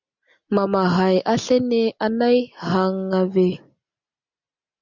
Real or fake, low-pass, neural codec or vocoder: real; 7.2 kHz; none